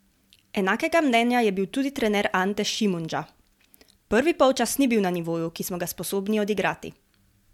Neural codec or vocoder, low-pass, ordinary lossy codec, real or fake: none; 19.8 kHz; MP3, 96 kbps; real